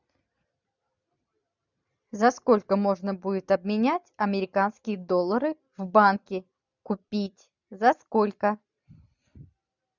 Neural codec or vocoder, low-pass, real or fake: none; 7.2 kHz; real